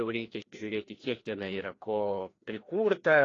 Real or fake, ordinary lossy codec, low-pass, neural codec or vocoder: fake; AAC, 32 kbps; 7.2 kHz; codec, 16 kHz, 1 kbps, FreqCodec, larger model